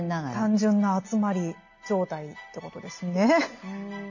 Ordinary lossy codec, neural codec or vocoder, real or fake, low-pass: none; none; real; 7.2 kHz